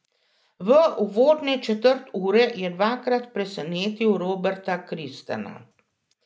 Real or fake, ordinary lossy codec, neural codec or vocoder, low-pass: real; none; none; none